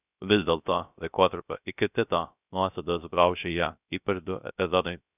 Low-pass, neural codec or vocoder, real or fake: 3.6 kHz; codec, 16 kHz, 0.3 kbps, FocalCodec; fake